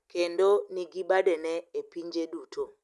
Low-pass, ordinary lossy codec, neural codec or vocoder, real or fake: none; none; none; real